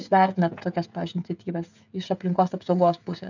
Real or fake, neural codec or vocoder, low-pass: fake; codec, 16 kHz, 16 kbps, FreqCodec, smaller model; 7.2 kHz